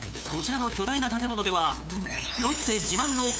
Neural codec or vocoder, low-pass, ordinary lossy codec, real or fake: codec, 16 kHz, 2 kbps, FunCodec, trained on LibriTTS, 25 frames a second; none; none; fake